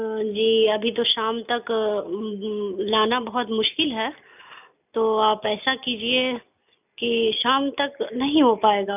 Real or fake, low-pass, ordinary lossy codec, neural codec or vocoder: real; 3.6 kHz; none; none